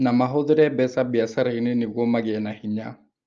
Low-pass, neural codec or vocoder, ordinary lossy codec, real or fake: 7.2 kHz; none; Opus, 24 kbps; real